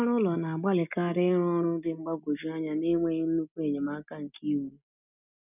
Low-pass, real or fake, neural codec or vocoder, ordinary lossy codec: 3.6 kHz; real; none; none